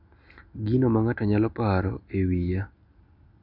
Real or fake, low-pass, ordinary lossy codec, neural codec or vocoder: real; 5.4 kHz; none; none